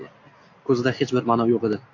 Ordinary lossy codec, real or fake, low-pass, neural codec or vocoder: AAC, 48 kbps; real; 7.2 kHz; none